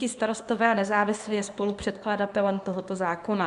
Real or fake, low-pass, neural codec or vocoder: fake; 10.8 kHz; codec, 24 kHz, 0.9 kbps, WavTokenizer, small release